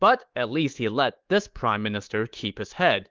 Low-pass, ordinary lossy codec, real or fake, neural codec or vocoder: 7.2 kHz; Opus, 16 kbps; fake; codec, 24 kHz, 3.1 kbps, DualCodec